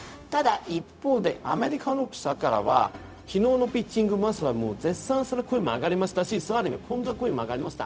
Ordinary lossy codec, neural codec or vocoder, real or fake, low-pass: none; codec, 16 kHz, 0.4 kbps, LongCat-Audio-Codec; fake; none